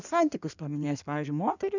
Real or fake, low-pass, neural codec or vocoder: fake; 7.2 kHz; codec, 16 kHz in and 24 kHz out, 1.1 kbps, FireRedTTS-2 codec